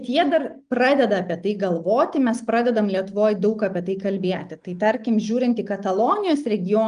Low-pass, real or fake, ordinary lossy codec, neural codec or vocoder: 9.9 kHz; real; Opus, 32 kbps; none